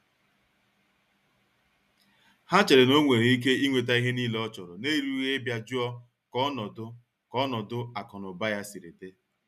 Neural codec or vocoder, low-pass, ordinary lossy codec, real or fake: none; 14.4 kHz; none; real